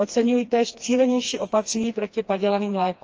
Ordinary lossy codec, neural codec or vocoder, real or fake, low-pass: Opus, 24 kbps; codec, 16 kHz, 2 kbps, FreqCodec, smaller model; fake; 7.2 kHz